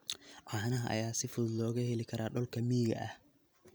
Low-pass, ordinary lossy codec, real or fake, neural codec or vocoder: none; none; real; none